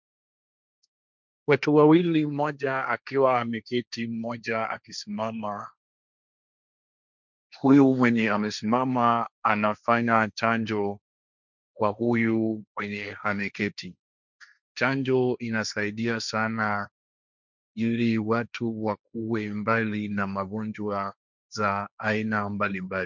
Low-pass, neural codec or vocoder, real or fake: 7.2 kHz; codec, 16 kHz, 1.1 kbps, Voila-Tokenizer; fake